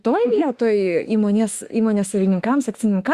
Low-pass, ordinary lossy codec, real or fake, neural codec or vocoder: 14.4 kHz; Opus, 64 kbps; fake; autoencoder, 48 kHz, 32 numbers a frame, DAC-VAE, trained on Japanese speech